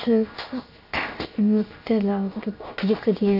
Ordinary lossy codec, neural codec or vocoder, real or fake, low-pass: none; codec, 16 kHz, 0.7 kbps, FocalCodec; fake; 5.4 kHz